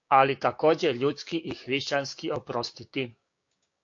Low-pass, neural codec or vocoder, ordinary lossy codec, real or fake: 7.2 kHz; codec, 16 kHz, 6 kbps, DAC; AAC, 48 kbps; fake